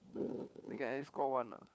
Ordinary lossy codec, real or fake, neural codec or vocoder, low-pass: none; fake; codec, 16 kHz, 4 kbps, FunCodec, trained on LibriTTS, 50 frames a second; none